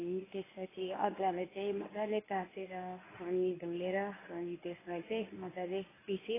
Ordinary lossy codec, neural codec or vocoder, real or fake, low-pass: AAC, 16 kbps; codec, 24 kHz, 0.9 kbps, WavTokenizer, medium speech release version 1; fake; 3.6 kHz